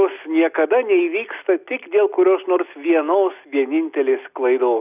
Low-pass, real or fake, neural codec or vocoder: 3.6 kHz; real; none